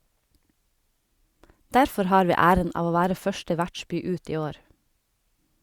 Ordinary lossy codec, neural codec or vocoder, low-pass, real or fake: Opus, 64 kbps; none; 19.8 kHz; real